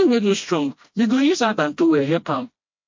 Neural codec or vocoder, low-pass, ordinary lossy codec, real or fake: codec, 16 kHz, 1 kbps, FreqCodec, smaller model; 7.2 kHz; MP3, 48 kbps; fake